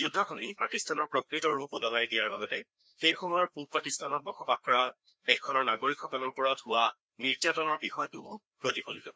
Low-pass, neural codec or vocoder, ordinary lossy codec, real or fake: none; codec, 16 kHz, 1 kbps, FreqCodec, larger model; none; fake